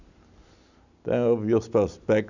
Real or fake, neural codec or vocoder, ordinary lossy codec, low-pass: real; none; none; 7.2 kHz